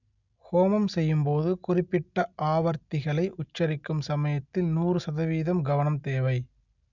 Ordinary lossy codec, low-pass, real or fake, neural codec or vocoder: none; 7.2 kHz; real; none